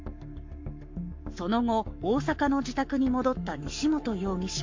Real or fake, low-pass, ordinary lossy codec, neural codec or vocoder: fake; 7.2 kHz; MP3, 48 kbps; codec, 44.1 kHz, 7.8 kbps, Pupu-Codec